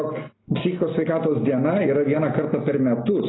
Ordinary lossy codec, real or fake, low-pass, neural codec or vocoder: AAC, 16 kbps; real; 7.2 kHz; none